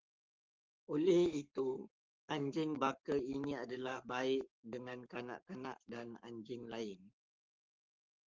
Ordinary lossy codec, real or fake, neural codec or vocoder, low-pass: Opus, 32 kbps; fake; codec, 44.1 kHz, 7.8 kbps, Pupu-Codec; 7.2 kHz